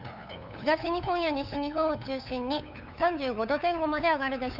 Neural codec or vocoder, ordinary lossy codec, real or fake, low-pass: codec, 16 kHz, 8 kbps, FunCodec, trained on LibriTTS, 25 frames a second; none; fake; 5.4 kHz